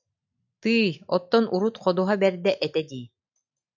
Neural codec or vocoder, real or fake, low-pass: none; real; 7.2 kHz